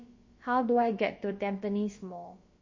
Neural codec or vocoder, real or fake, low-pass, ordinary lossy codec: codec, 16 kHz, about 1 kbps, DyCAST, with the encoder's durations; fake; 7.2 kHz; MP3, 32 kbps